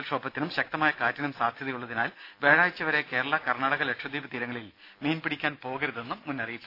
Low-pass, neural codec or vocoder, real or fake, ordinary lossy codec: 5.4 kHz; none; real; AAC, 32 kbps